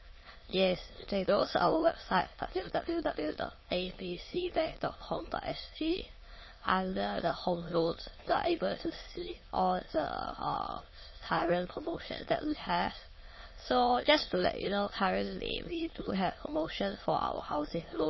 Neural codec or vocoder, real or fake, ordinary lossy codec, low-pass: autoencoder, 22.05 kHz, a latent of 192 numbers a frame, VITS, trained on many speakers; fake; MP3, 24 kbps; 7.2 kHz